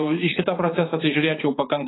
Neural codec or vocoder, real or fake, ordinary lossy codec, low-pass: vocoder, 44.1 kHz, 80 mel bands, Vocos; fake; AAC, 16 kbps; 7.2 kHz